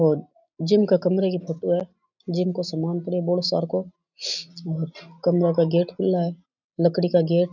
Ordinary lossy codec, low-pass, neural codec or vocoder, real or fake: none; 7.2 kHz; none; real